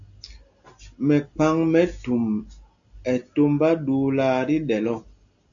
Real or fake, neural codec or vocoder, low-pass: real; none; 7.2 kHz